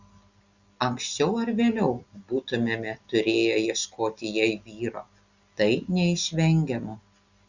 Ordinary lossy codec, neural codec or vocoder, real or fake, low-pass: Opus, 64 kbps; none; real; 7.2 kHz